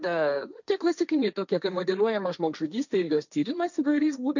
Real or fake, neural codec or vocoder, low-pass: fake; codec, 16 kHz, 1.1 kbps, Voila-Tokenizer; 7.2 kHz